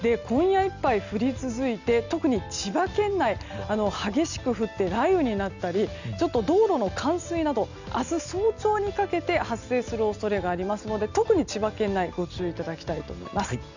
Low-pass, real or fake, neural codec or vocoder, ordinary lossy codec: 7.2 kHz; real; none; none